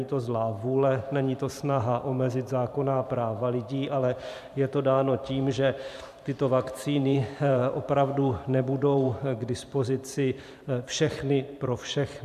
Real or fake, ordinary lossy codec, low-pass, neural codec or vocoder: fake; MP3, 96 kbps; 14.4 kHz; vocoder, 44.1 kHz, 128 mel bands every 512 samples, BigVGAN v2